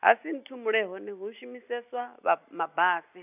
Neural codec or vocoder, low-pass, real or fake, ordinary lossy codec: none; 3.6 kHz; real; none